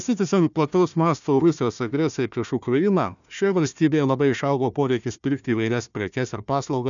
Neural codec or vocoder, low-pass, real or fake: codec, 16 kHz, 1 kbps, FunCodec, trained on Chinese and English, 50 frames a second; 7.2 kHz; fake